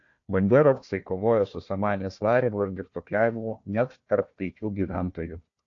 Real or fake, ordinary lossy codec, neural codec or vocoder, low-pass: fake; AAC, 48 kbps; codec, 16 kHz, 1 kbps, FunCodec, trained on Chinese and English, 50 frames a second; 7.2 kHz